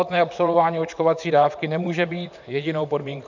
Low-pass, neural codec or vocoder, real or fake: 7.2 kHz; vocoder, 44.1 kHz, 128 mel bands, Pupu-Vocoder; fake